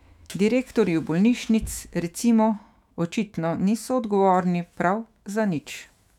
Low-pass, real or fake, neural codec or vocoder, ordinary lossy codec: 19.8 kHz; fake; autoencoder, 48 kHz, 128 numbers a frame, DAC-VAE, trained on Japanese speech; none